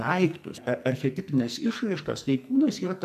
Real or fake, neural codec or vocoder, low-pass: fake; codec, 44.1 kHz, 2.6 kbps, SNAC; 14.4 kHz